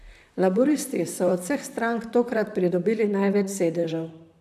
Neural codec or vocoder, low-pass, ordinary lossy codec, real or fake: vocoder, 44.1 kHz, 128 mel bands, Pupu-Vocoder; 14.4 kHz; none; fake